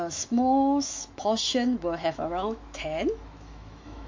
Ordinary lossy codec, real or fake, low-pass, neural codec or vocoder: MP3, 48 kbps; fake; 7.2 kHz; autoencoder, 48 kHz, 128 numbers a frame, DAC-VAE, trained on Japanese speech